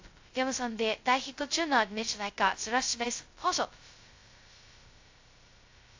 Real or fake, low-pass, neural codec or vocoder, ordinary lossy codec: fake; 7.2 kHz; codec, 16 kHz, 0.2 kbps, FocalCodec; AAC, 48 kbps